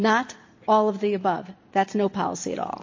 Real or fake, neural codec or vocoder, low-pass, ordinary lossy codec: real; none; 7.2 kHz; MP3, 32 kbps